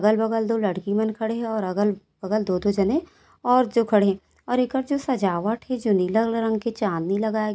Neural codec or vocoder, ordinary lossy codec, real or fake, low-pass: none; none; real; none